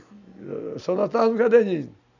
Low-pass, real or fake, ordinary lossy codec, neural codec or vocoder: 7.2 kHz; real; none; none